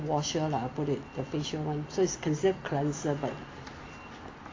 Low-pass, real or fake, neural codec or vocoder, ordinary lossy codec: 7.2 kHz; real; none; AAC, 32 kbps